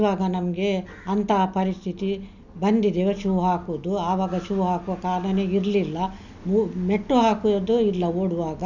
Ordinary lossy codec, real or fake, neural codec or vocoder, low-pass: none; real; none; 7.2 kHz